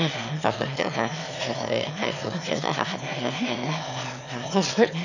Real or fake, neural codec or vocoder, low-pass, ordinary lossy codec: fake; autoencoder, 22.05 kHz, a latent of 192 numbers a frame, VITS, trained on one speaker; 7.2 kHz; none